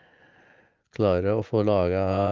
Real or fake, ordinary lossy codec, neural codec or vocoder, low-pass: fake; Opus, 32 kbps; vocoder, 24 kHz, 100 mel bands, Vocos; 7.2 kHz